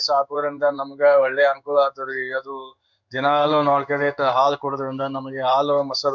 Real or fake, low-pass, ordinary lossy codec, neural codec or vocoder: fake; 7.2 kHz; none; codec, 16 kHz in and 24 kHz out, 1 kbps, XY-Tokenizer